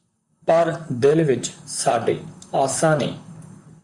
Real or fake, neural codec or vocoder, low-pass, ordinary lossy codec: fake; vocoder, 44.1 kHz, 128 mel bands, Pupu-Vocoder; 10.8 kHz; Opus, 64 kbps